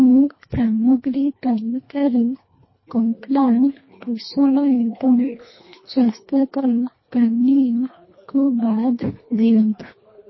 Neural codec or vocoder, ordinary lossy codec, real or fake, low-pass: codec, 24 kHz, 1.5 kbps, HILCodec; MP3, 24 kbps; fake; 7.2 kHz